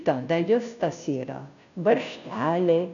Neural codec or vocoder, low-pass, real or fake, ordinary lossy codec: codec, 16 kHz, 0.5 kbps, FunCodec, trained on Chinese and English, 25 frames a second; 7.2 kHz; fake; MP3, 96 kbps